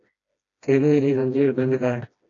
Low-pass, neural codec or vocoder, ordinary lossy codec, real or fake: 7.2 kHz; codec, 16 kHz, 1 kbps, FreqCodec, smaller model; AAC, 48 kbps; fake